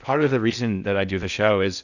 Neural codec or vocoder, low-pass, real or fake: codec, 16 kHz in and 24 kHz out, 0.6 kbps, FocalCodec, streaming, 4096 codes; 7.2 kHz; fake